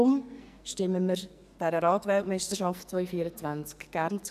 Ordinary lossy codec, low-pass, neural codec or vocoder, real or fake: none; 14.4 kHz; codec, 44.1 kHz, 2.6 kbps, SNAC; fake